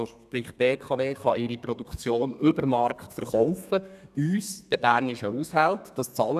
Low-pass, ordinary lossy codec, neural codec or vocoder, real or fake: 14.4 kHz; none; codec, 32 kHz, 1.9 kbps, SNAC; fake